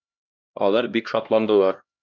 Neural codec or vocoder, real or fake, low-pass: codec, 16 kHz, 1 kbps, X-Codec, HuBERT features, trained on LibriSpeech; fake; 7.2 kHz